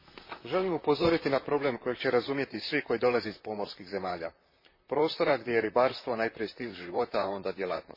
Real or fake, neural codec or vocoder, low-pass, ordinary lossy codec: fake; vocoder, 44.1 kHz, 128 mel bands, Pupu-Vocoder; 5.4 kHz; MP3, 24 kbps